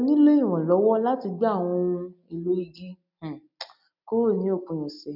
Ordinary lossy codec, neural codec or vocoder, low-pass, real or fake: none; none; 5.4 kHz; real